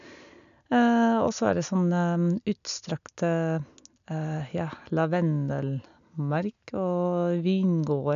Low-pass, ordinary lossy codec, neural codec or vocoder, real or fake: 7.2 kHz; none; none; real